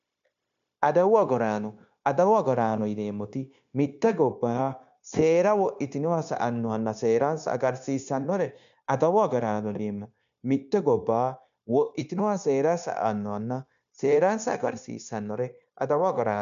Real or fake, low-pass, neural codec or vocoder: fake; 7.2 kHz; codec, 16 kHz, 0.9 kbps, LongCat-Audio-Codec